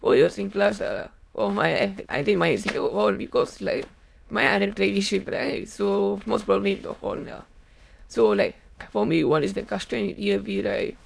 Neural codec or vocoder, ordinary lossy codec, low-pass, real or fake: autoencoder, 22.05 kHz, a latent of 192 numbers a frame, VITS, trained on many speakers; none; none; fake